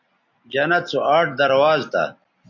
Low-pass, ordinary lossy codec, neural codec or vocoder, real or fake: 7.2 kHz; MP3, 64 kbps; none; real